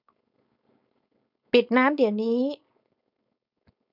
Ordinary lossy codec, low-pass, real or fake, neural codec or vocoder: none; 5.4 kHz; fake; codec, 16 kHz, 4.8 kbps, FACodec